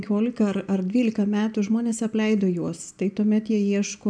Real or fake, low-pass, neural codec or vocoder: real; 9.9 kHz; none